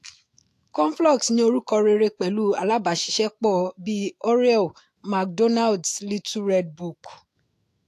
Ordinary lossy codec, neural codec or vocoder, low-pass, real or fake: AAC, 64 kbps; autoencoder, 48 kHz, 128 numbers a frame, DAC-VAE, trained on Japanese speech; 14.4 kHz; fake